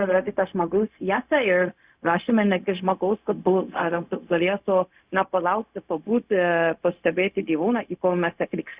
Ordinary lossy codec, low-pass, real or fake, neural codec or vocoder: Opus, 64 kbps; 3.6 kHz; fake; codec, 16 kHz, 0.4 kbps, LongCat-Audio-Codec